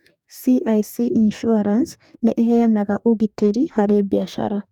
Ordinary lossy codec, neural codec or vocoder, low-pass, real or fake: none; codec, 44.1 kHz, 2.6 kbps, DAC; 19.8 kHz; fake